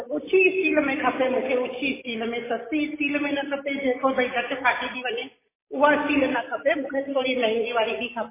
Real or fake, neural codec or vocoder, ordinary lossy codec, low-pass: real; none; MP3, 16 kbps; 3.6 kHz